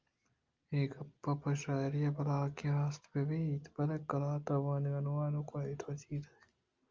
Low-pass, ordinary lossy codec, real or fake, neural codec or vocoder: 7.2 kHz; Opus, 32 kbps; real; none